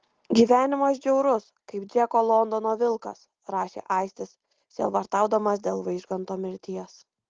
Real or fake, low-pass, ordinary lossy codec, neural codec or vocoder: real; 7.2 kHz; Opus, 16 kbps; none